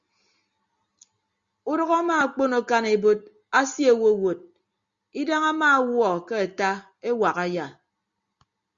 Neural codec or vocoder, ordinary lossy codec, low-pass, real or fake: none; Opus, 64 kbps; 7.2 kHz; real